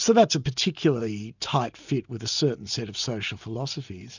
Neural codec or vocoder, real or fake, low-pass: none; real; 7.2 kHz